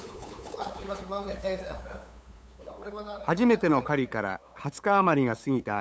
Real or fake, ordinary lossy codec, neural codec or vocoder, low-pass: fake; none; codec, 16 kHz, 8 kbps, FunCodec, trained on LibriTTS, 25 frames a second; none